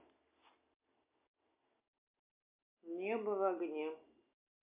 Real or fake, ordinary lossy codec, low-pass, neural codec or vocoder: real; MP3, 24 kbps; 3.6 kHz; none